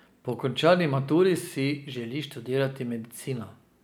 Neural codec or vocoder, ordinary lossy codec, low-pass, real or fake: none; none; none; real